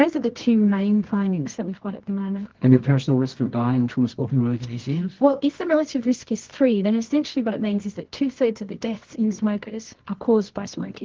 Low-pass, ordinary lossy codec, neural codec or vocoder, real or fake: 7.2 kHz; Opus, 16 kbps; codec, 24 kHz, 0.9 kbps, WavTokenizer, medium music audio release; fake